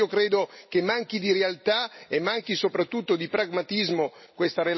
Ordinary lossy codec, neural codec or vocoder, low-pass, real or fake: MP3, 24 kbps; none; 7.2 kHz; real